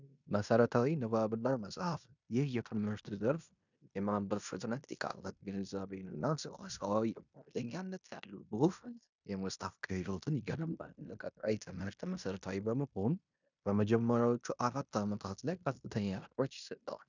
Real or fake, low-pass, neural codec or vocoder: fake; 7.2 kHz; codec, 16 kHz in and 24 kHz out, 0.9 kbps, LongCat-Audio-Codec, fine tuned four codebook decoder